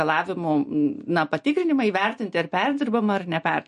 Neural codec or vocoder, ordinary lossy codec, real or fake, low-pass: none; MP3, 48 kbps; real; 14.4 kHz